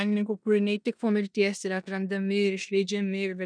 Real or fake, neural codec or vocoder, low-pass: fake; codec, 16 kHz in and 24 kHz out, 0.9 kbps, LongCat-Audio-Codec, four codebook decoder; 9.9 kHz